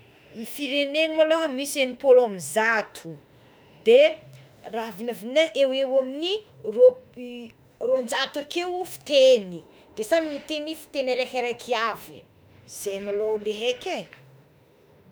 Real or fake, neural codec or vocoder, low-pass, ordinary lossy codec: fake; autoencoder, 48 kHz, 32 numbers a frame, DAC-VAE, trained on Japanese speech; none; none